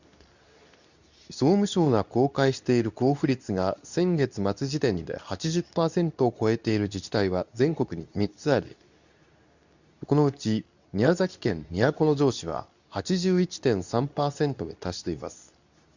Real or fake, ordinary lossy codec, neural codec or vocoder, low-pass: fake; none; codec, 24 kHz, 0.9 kbps, WavTokenizer, medium speech release version 2; 7.2 kHz